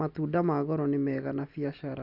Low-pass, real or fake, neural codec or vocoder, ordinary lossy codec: 5.4 kHz; real; none; none